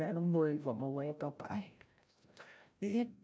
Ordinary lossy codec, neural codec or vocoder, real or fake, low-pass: none; codec, 16 kHz, 0.5 kbps, FreqCodec, larger model; fake; none